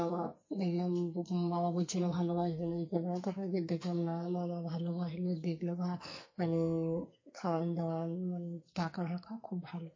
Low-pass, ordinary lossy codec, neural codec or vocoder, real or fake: 7.2 kHz; MP3, 32 kbps; codec, 44.1 kHz, 2.6 kbps, SNAC; fake